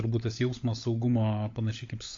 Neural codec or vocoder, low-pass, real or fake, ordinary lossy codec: codec, 16 kHz, 16 kbps, FunCodec, trained on LibriTTS, 50 frames a second; 7.2 kHz; fake; AAC, 48 kbps